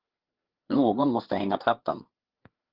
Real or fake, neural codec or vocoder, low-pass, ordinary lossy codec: fake; codec, 16 kHz, 4 kbps, FreqCodec, larger model; 5.4 kHz; Opus, 16 kbps